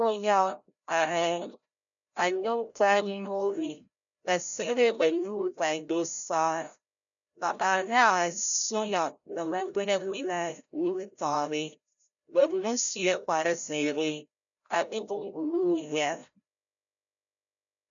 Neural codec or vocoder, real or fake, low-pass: codec, 16 kHz, 0.5 kbps, FreqCodec, larger model; fake; 7.2 kHz